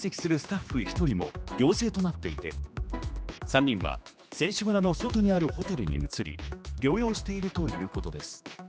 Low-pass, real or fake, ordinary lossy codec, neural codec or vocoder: none; fake; none; codec, 16 kHz, 2 kbps, X-Codec, HuBERT features, trained on general audio